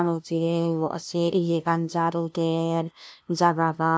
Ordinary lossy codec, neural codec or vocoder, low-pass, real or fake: none; codec, 16 kHz, 0.5 kbps, FunCodec, trained on LibriTTS, 25 frames a second; none; fake